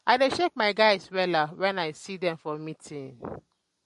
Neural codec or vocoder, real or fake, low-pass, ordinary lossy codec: none; real; 14.4 kHz; MP3, 48 kbps